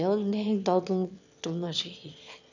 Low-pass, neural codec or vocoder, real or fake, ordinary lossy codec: 7.2 kHz; autoencoder, 22.05 kHz, a latent of 192 numbers a frame, VITS, trained on one speaker; fake; none